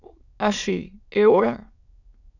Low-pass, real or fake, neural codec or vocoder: 7.2 kHz; fake; autoencoder, 22.05 kHz, a latent of 192 numbers a frame, VITS, trained on many speakers